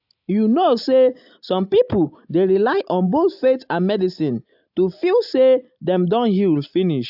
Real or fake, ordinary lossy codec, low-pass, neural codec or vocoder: real; AAC, 48 kbps; 5.4 kHz; none